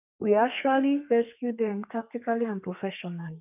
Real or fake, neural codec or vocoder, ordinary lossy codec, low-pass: fake; codec, 32 kHz, 1.9 kbps, SNAC; none; 3.6 kHz